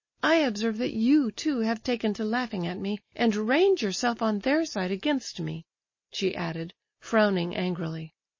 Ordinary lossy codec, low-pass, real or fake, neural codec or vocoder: MP3, 32 kbps; 7.2 kHz; real; none